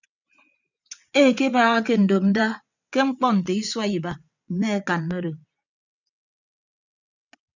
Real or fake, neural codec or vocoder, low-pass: fake; vocoder, 22.05 kHz, 80 mel bands, WaveNeXt; 7.2 kHz